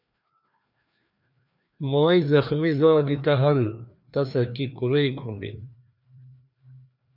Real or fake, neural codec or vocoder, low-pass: fake; codec, 16 kHz, 2 kbps, FreqCodec, larger model; 5.4 kHz